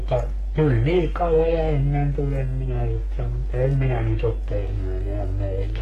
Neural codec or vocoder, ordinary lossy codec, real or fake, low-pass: codec, 44.1 kHz, 3.4 kbps, Pupu-Codec; AAC, 48 kbps; fake; 14.4 kHz